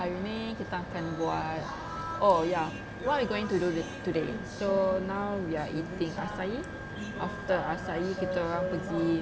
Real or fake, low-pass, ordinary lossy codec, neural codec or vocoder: real; none; none; none